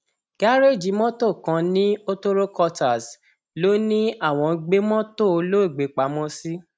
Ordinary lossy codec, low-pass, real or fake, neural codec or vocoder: none; none; real; none